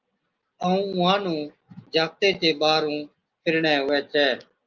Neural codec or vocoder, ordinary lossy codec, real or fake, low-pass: none; Opus, 24 kbps; real; 7.2 kHz